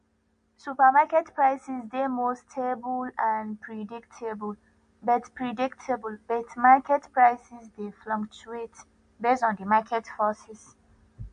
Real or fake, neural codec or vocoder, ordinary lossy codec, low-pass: real; none; MP3, 48 kbps; 9.9 kHz